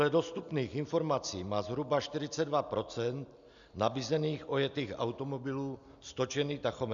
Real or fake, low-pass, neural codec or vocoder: real; 7.2 kHz; none